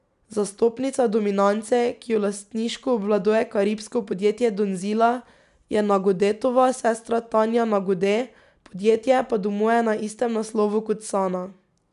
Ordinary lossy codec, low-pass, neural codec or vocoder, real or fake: none; 10.8 kHz; none; real